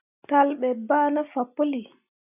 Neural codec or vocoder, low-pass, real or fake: none; 3.6 kHz; real